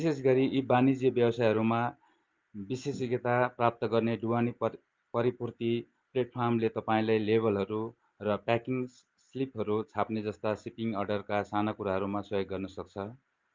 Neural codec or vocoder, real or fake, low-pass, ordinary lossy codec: none; real; 7.2 kHz; Opus, 32 kbps